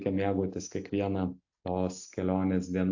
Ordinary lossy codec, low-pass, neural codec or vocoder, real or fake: AAC, 48 kbps; 7.2 kHz; none; real